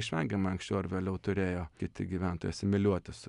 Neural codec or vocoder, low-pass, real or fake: none; 10.8 kHz; real